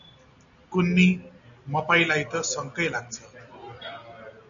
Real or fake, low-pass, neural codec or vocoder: real; 7.2 kHz; none